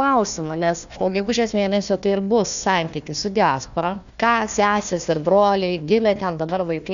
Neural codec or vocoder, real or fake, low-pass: codec, 16 kHz, 1 kbps, FunCodec, trained on Chinese and English, 50 frames a second; fake; 7.2 kHz